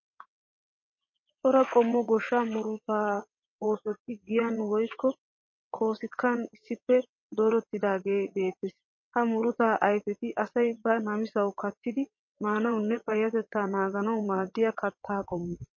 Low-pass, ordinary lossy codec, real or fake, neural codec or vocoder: 7.2 kHz; MP3, 32 kbps; fake; vocoder, 22.05 kHz, 80 mel bands, WaveNeXt